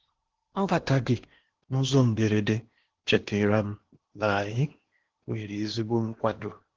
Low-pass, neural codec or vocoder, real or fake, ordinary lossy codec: 7.2 kHz; codec, 16 kHz in and 24 kHz out, 0.8 kbps, FocalCodec, streaming, 65536 codes; fake; Opus, 16 kbps